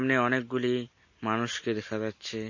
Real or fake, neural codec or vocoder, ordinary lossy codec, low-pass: real; none; MP3, 32 kbps; 7.2 kHz